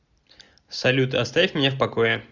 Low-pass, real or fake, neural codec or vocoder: 7.2 kHz; real; none